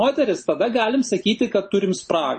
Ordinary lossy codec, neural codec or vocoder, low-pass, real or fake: MP3, 32 kbps; none; 10.8 kHz; real